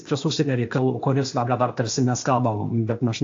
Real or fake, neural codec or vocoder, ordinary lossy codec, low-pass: fake; codec, 16 kHz, 0.8 kbps, ZipCodec; AAC, 48 kbps; 7.2 kHz